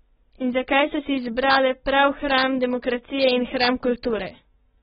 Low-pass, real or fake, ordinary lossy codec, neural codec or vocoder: 19.8 kHz; real; AAC, 16 kbps; none